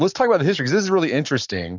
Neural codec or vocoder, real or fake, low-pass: codec, 44.1 kHz, 7.8 kbps, Pupu-Codec; fake; 7.2 kHz